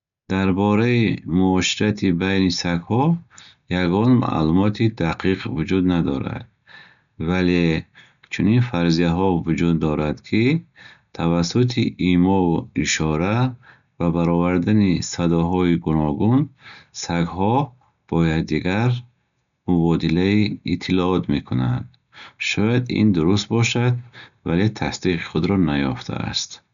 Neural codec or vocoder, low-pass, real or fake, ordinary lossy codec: none; 7.2 kHz; real; none